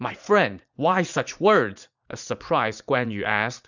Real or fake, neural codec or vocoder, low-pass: fake; vocoder, 44.1 kHz, 80 mel bands, Vocos; 7.2 kHz